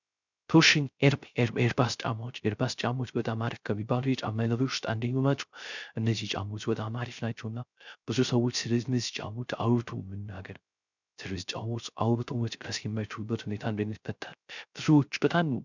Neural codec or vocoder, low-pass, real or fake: codec, 16 kHz, 0.3 kbps, FocalCodec; 7.2 kHz; fake